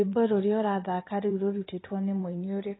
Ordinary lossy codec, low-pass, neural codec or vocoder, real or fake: AAC, 16 kbps; 7.2 kHz; vocoder, 44.1 kHz, 128 mel bands every 512 samples, BigVGAN v2; fake